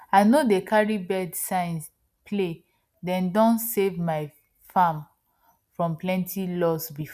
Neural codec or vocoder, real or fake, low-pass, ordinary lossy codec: none; real; 14.4 kHz; none